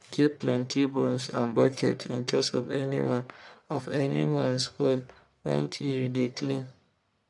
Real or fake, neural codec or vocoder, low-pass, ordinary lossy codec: fake; codec, 44.1 kHz, 1.7 kbps, Pupu-Codec; 10.8 kHz; none